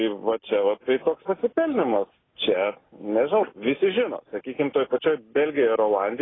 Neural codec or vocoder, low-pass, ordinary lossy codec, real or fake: none; 7.2 kHz; AAC, 16 kbps; real